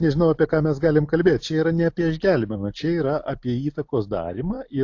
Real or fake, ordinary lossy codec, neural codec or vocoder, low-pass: real; AAC, 48 kbps; none; 7.2 kHz